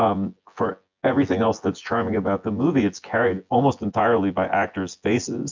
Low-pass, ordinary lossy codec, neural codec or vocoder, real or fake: 7.2 kHz; MP3, 64 kbps; vocoder, 24 kHz, 100 mel bands, Vocos; fake